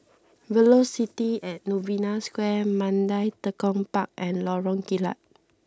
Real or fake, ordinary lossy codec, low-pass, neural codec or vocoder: real; none; none; none